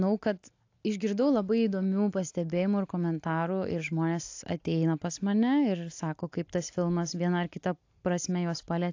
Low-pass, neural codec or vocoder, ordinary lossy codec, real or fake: 7.2 kHz; none; AAC, 48 kbps; real